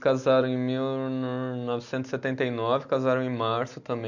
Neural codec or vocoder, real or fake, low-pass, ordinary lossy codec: none; real; 7.2 kHz; none